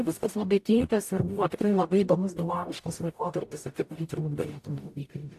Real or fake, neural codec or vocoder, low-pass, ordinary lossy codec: fake; codec, 44.1 kHz, 0.9 kbps, DAC; 14.4 kHz; AAC, 64 kbps